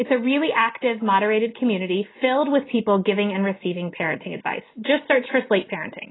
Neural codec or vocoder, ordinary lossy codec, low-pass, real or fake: none; AAC, 16 kbps; 7.2 kHz; real